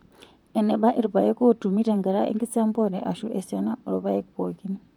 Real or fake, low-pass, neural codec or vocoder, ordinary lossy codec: fake; 19.8 kHz; vocoder, 44.1 kHz, 128 mel bands, Pupu-Vocoder; none